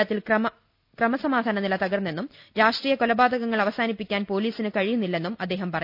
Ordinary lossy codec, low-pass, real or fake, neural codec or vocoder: none; 5.4 kHz; real; none